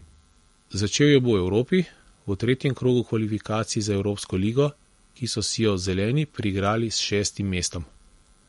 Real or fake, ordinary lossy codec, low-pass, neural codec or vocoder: real; MP3, 48 kbps; 19.8 kHz; none